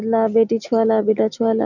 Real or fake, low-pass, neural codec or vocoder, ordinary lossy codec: real; 7.2 kHz; none; none